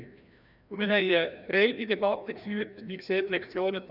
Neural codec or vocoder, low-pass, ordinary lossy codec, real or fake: codec, 16 kHz, 1 kbps, FreqCodec, larger model; 5.4 kHz; none; fake